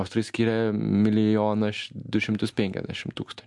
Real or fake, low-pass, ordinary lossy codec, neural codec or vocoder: real; 10.8 kHz; MP3, 64 kbps; none